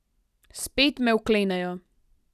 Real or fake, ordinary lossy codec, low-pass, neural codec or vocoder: real; none; 14.4 kHz; none